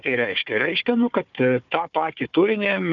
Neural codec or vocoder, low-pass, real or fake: codec, 16 kHz, 4 kbps, FunCodec, trained on Chinese and English, 50 frames a second; 7.2 kHz; fake